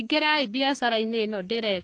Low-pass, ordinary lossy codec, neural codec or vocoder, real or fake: 9.9 kHz; AAC, 48 kbps; codec, 44.1 kHz, 2.6 kbps, SNAC; fake